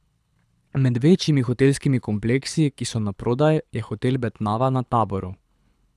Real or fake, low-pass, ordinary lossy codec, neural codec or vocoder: fake; none; none; codec, 24 kHz, 6 kbps, HILCodec